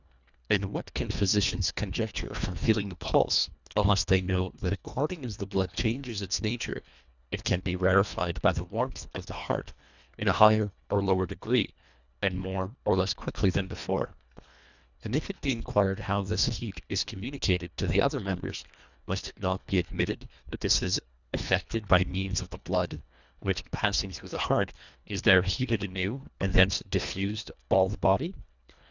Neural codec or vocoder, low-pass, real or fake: codec, 24 kHz, 1.5 kbps, HILCodec; 7.2 kHz; fake